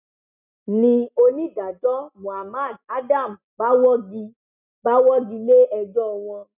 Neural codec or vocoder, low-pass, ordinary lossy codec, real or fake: none; 3.6 kHz; none; real